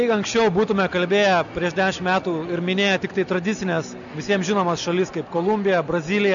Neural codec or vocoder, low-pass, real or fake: none; 7.2 kHz; real